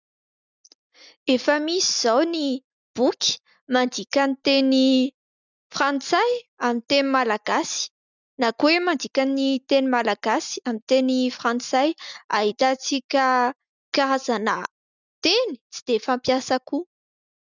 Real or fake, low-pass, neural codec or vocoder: real; 7.2 kHz; none